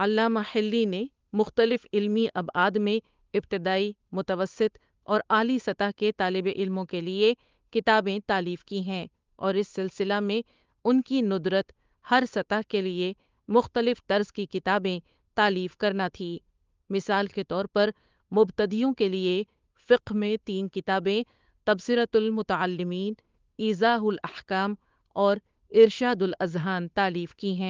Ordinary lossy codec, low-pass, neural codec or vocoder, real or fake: Opus, 32 kbps; 7.2 kHz; codec, 16 kHz, 8 kbps, FunCodec, trained on Chinese and English, 25 frames a second; fake